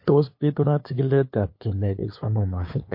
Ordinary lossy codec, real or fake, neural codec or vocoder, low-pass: MP3, 32 kbps; fake; codec, 16 kHz, 2 kbps, FunCodec, trained on LibriTTS, 25 frames a second; 5.4 kHz